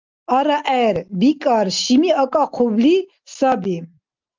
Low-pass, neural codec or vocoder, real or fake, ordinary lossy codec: 7.2 kHz; none; real; Opus, 24 kbps